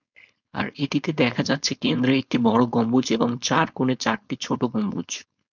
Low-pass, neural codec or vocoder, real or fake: 7.2 kHz; codec, 16 kHz, 4.8 kbps, FACodec; fake